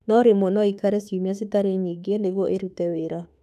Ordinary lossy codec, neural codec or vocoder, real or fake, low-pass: none; autoencoder, 48 kHz, 32 numbers a frame, DAC-VAE, trained on Japanese speech; fake; 14.4 kHz